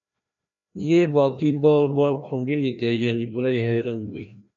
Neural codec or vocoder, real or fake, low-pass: codec, 16 kHz, 1 kbps, FreqCodec, larger model; fake; 7.2 kHz